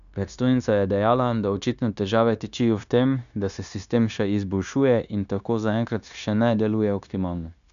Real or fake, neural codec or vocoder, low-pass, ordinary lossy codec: fake; codec, 16 kHz, 0.9 kbps, LongCat-Audio-Codec; 7.2 kHz; none